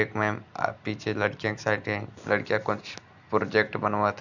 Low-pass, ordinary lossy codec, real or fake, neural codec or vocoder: 7.2 kHz; none; real; none